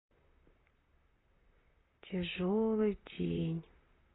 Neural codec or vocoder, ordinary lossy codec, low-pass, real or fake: vocoder, 44.1 kHz, 128 mel bands, Pupu-Vocoder; AAC, 16 kbps; 7.2 kHz; fake